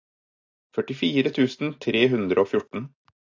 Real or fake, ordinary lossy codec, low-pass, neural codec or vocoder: real; AAC, 48 kbps; 7.2 kHz; none